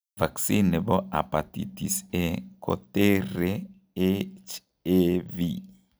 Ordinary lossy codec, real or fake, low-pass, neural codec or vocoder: none; real; none; none